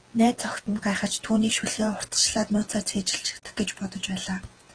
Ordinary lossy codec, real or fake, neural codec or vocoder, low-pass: Opus, 16 kbps; fake; vocoder, 48 kHz, 128 mel bands, Vocos; 9.9 kHz